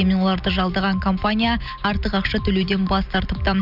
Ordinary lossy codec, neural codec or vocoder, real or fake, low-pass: none; none; real; 5.4 kHz